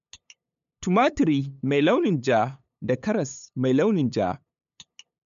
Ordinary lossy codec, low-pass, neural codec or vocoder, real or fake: MP3, 64 kbps; 7.2 kHz; codec, 16 kHz, 8 kbps, FunCodec, trained on LibriTTS, 25 frames a second; fake